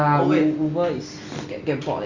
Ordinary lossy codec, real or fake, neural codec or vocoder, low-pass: Opus, 64 kbps; real; none; 7.2 kHz